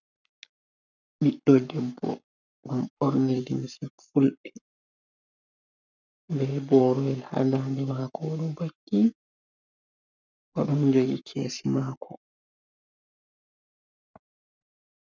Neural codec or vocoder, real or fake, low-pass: codec, 44.1 kHz, 7.8 kbps, Pupu-Codec; fake; 7.2 kHz